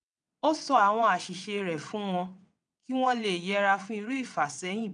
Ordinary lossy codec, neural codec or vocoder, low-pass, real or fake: none; vocoder, 22.05 kHz, 80 mel bands, WaveNeXt; 9.9 kHz; fake